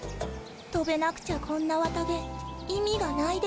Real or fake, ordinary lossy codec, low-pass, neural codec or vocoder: real; none; none; none